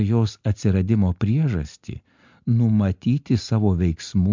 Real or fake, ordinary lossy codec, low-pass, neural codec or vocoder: real; MP3, 64 kbps; 7.2 kHz; none